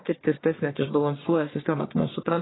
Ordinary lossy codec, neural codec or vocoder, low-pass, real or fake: AAC, 16 kbps; codec, 44.1 kHz, 1.7 kbps, Pupu-Codec; 7.2 kHz; fake